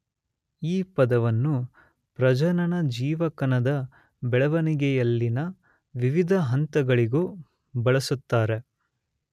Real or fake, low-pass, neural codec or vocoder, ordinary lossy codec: real; 14.4 kHz; none; none